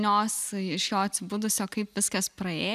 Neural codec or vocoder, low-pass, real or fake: none; 14.4 kHz; real